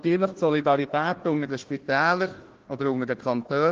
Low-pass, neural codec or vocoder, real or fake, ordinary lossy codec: 7.2 kHz; codec, 16 kHz, 1 kbps, FunCodec, trained on Chinese and English, 50 frames a second; fake; Opus, 16 kbps